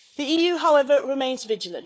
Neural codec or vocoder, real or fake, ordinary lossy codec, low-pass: codec, 16 kHz, 4 kbps, FunCodec, trained on Chinese and English, 50 frames a second; fake; none; none